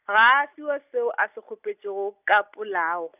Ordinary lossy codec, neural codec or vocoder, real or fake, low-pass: none; none; real; 3.6 kHz